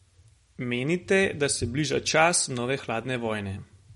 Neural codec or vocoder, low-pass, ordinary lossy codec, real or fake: none; 19.8 kHz; MP3, 48 kbps; real